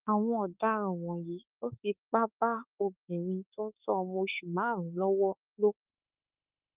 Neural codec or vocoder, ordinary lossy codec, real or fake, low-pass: autoencoder, 48 kHz, 32 numbers a frame, DAC-VAE, trained on Japanese speech; Opus, 24 kbps; fake; 3.6 kHz